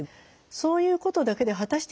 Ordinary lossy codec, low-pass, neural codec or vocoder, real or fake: none; none; none; real